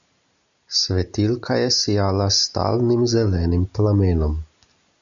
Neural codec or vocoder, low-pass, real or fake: none; 7.2 kHz; real